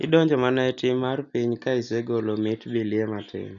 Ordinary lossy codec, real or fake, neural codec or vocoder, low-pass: none; real; none; 7.2 kHz